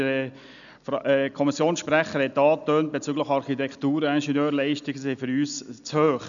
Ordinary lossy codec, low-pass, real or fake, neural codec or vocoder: none; 7.2 kHz; real; none